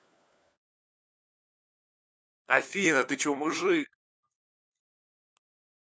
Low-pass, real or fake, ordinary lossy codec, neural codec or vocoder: none; fake; none; codec, 16 kHz, 4 kbps, FunCodec, trained on LibriTTS, 50 frames a second